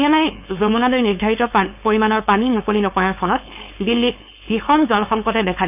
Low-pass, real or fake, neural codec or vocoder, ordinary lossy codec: 3.6 kHz; fake; codec, 16 kHz, 4.8 kbps, FACodec; none